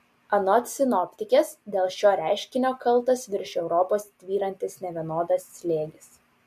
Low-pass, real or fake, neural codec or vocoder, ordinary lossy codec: 14.4 kHz; real; none; MP3, 64 kbps